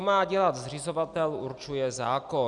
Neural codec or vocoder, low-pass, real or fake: none; 10.8 kHz; real